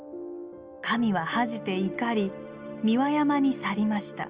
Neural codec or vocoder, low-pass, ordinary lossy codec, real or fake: none; 3.6 kHz; Opus, 32 kbps; real